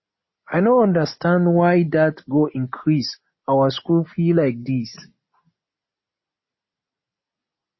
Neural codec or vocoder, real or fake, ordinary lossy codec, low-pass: none; real; MP3, 24 kbps; 7.2 kHz